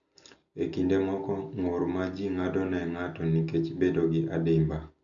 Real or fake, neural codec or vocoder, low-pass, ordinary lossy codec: real; none; 7.2 kHz; none